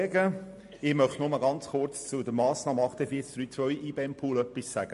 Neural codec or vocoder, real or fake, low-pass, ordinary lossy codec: none; real; 14.4 kHz; MP3, 48 kbps